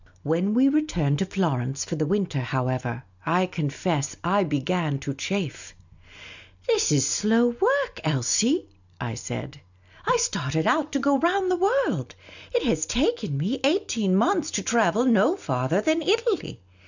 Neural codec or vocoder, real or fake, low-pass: none; real; 7.2 kHz